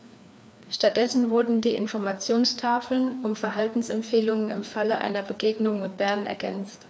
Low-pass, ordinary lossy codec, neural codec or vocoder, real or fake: none; none; codec, 16 kHz, 2 kbps, FreqCodec, larger model; fake